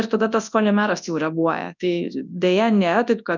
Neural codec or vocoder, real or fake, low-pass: codec, 24 kHz, 0.9 kbps, WavTokenizer, large speech release; fake; 7.2 kHz